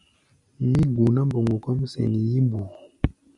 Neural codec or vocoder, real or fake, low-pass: none; real; 10.8 kHz